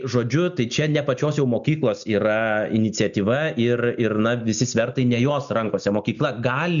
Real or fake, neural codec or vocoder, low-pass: real; none; 7.2 kHz